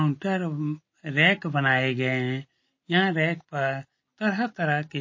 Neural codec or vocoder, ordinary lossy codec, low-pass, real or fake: none; MP3, 32 kbps; 7.2 kHz; real